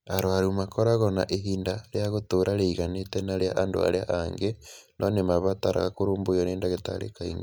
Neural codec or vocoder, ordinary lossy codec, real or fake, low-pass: none; none; real; none